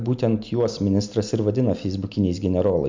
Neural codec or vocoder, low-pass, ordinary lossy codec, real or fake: none; 7.2 kHz; MP3, 64 kbps; real